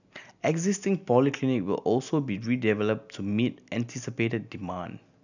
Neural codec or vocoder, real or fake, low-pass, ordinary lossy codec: none; real; 7.2 kHz; none